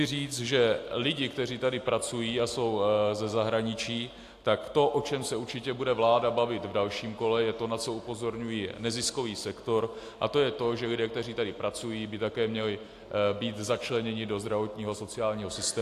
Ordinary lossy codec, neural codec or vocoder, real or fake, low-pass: AAC, 64 kbps; none; real; 14.4 kHz